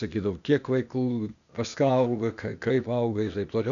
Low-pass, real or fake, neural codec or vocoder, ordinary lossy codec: 7.2 kHz; fake; codec, 16 kHz, 0.8 kbps, ZipCodec; MP3, 96 kbps